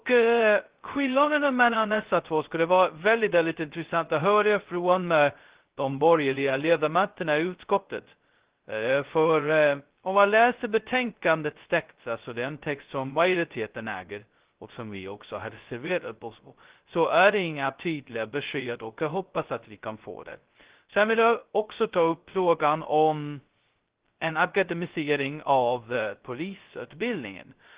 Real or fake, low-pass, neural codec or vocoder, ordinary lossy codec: fake; 3.6 kHz; codec, 16 kHz, 0.2 kbps, FocalCodec; Opus, 16 kbps